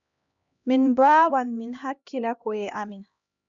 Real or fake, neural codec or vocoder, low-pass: fake; codec, 16 kHz, 1 kbps, X-Codec, HuBERT features, trained on LibriSpeech; 7.2 kHz